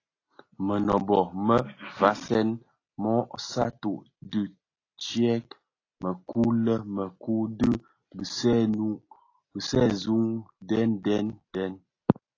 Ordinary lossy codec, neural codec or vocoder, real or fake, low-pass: AAC, 32 kbps; none; real; 7.2 kHz